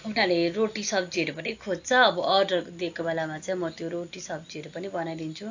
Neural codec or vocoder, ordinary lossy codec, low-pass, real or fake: none; AAC, 48 kbps; 7.2 kHz; real